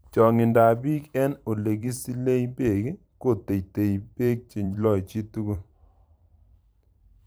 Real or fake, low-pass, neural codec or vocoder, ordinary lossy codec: fake; none; vocoder, 44.1 kHz, 128 mel bands every 512 samples, BigVGAN v2; none